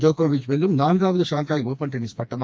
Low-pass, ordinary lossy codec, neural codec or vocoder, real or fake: none; none; codec, 16 kHz, 2 kbps, FreqCodec, smaller model; fake